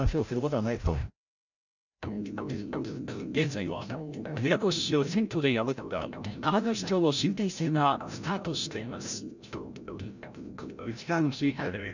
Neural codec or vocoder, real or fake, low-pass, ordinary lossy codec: codec, 16 kHz, 0.5 kbps, FreqCodec, larger model; fake; 7.2 kHz; none